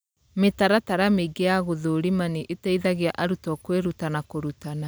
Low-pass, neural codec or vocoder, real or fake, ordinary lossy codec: none; none; real; none